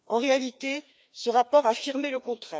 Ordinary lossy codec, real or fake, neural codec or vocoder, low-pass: none; fake; codec, 16 kHz, 2 kbps, FreqCodec, larger model; none